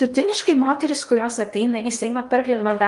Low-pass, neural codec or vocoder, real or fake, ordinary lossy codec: 10.8 kHz; codec, 16 kHz in and 24 kHz out, 0.8 kbps, FocalCodec, streaming, 65536 codes; fake; Opus, 32 kbps